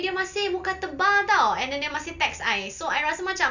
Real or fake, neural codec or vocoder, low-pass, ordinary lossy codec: real; none; 7.2 kHz; none